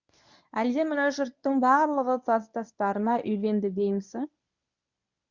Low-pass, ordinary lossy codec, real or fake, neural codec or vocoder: 7.2 kHz; Opus, 64 kbps; fake; codec, 24 kHz, 0.9 kbps, WavTokenizer, medium speech release version 1